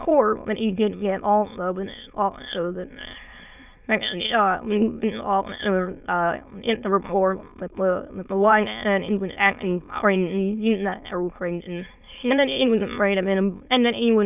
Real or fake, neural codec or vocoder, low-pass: fake; autoencoder, 22.05 kHz, a latent of 192 numbers a frame, VITS, trained on many speakers; 3.6 kHz